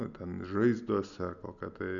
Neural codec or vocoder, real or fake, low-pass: none; real; 7.2 kHz